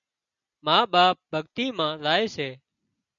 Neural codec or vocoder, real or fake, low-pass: none; real; 7.2 kHz